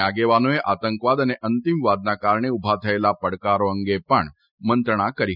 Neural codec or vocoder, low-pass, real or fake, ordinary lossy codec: none; 5.4 kHz; real; none